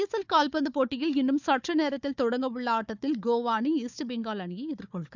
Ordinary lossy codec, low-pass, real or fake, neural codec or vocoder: none; 7.2 kHz; fake; codec, 16 kHz, 4 kbps, FunCodec, trained on Chinese and English, 50 frames a second